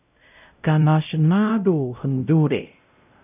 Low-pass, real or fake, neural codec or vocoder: 3.6 kHz; fake; codec, 16 kHz, 0.5 kbps, X-Codec, WavLM features, trained on Multilingual LibriSpeech